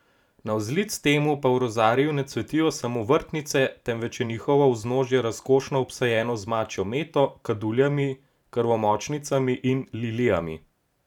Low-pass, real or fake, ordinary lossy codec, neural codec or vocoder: 19.8 kHz; fake; none; vocoder, 44.1 kHz, 128 mel bands every 512 samples, BigVGAN v2